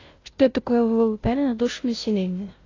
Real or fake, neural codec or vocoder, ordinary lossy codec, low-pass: fake; codec, 16 kHz, 0.5 kbps, FunCodec, trained on Chinese and English, 25 frames a second; AAC, 32 kbps; 7.2 kHz